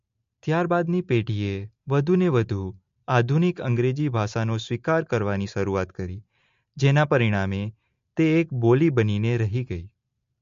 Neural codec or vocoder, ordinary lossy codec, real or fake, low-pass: none; MP3, 48 kbps; real; 7.2 kHz